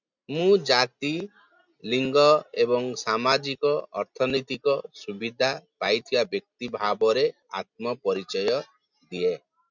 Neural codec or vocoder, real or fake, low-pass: none; real; 7.2 kHz